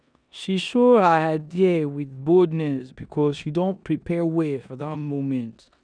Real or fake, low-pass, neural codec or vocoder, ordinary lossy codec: fake; 9.9 kHz; codec, 16 kHz in and 24 kHz out, 0.9 kbps, LongCat-Audio-Codec, four codebook decoder; none